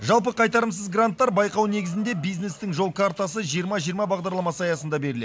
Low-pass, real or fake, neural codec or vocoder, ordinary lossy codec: none; real; none; none